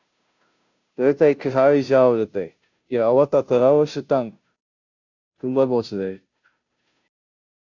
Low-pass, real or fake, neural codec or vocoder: 7.2 kHz; fake; codec, 16 kHz, 0.5 kbps, FunCodec, trained on Chinese and English, 25 frames a second